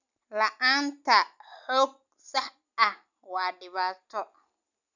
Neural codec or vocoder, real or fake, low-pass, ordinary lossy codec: none; real; 7.2 kHz; none